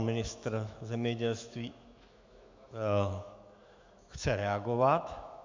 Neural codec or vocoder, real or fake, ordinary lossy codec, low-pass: autoencoder, 48 kHz, 128 numbers a frame, DAC-VAE, trained on Japanese speech; fake; MP3, 64 kbps; 7.2 kHz